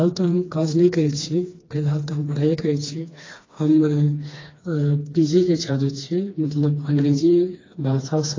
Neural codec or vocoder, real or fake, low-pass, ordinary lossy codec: codec, 16 kHz, 2 kbps, FreqCodec, smaller model; fake; 7.2 kHz; AAC, 32 kbps